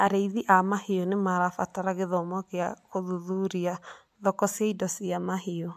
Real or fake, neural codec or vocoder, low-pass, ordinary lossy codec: real; none; 14.4 kHz; MP3, 96 kbps